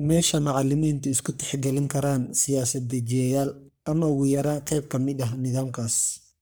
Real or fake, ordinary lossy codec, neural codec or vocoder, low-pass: fake; none; codec, 44.1 kHz, 3.4 kbps, Pupu-Codec; none